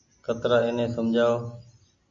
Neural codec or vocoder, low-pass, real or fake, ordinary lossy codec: none; 7.2 kHz; real; AAC, 48 kbps